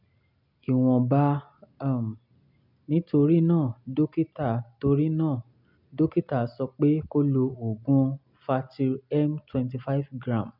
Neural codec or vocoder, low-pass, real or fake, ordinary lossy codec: none; 5.4 kHz; real; none